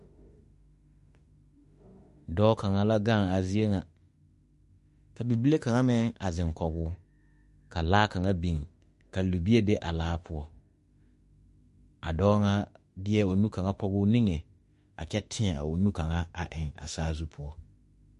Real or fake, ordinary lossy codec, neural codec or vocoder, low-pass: fake; MP3, 48 kbps; autoencoder, 48 kHz, 32 numbers a frame, DAC-VAE, trained on Japanese speech; 14.4 kHz